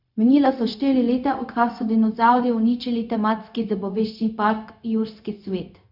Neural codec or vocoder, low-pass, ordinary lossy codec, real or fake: codec, 16 kHz, 0.4 kbps, LongCat-Audio-Codec; 5.4 kHz; none; fake